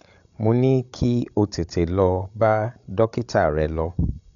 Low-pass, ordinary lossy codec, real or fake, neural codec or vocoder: 7.2 kHz; none; fake; codec, 16 kHz, 16 kbps, FreqCodec, larger model